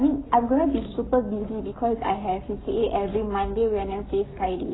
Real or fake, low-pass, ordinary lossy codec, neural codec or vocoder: fake; 7.2 kHz; AAC, 16 kbps; codec, 44.1 kHz, 7.8 kbps, Pupu-Codec